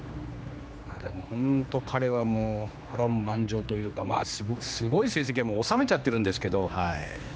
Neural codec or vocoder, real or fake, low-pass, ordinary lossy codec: codec, 16 kHz, 2 kbps, X-Codec, HuBERT features, trained on general audio; fake; none; none